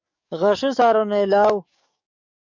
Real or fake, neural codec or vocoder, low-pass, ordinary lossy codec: fake; codec, 44.1 kHz, 7.8 kbps, DAC; 7.2 kHz; MP3, 64 kbps